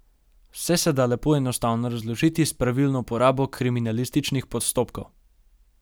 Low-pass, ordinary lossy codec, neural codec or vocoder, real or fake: none; none; none; real